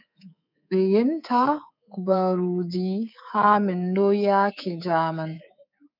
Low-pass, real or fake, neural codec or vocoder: 5.4 kHz; fake; codec, 24 kHz, 3.1 kbps, DualCodec